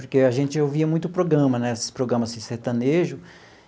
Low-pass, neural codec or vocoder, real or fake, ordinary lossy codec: none; none; real; none